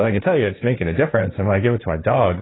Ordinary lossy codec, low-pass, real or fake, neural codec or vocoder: AAC, 16 kbps; 7.2 kHz; fake; codec, 16 kHz, 1.1 kbps, Voila-Tokenizer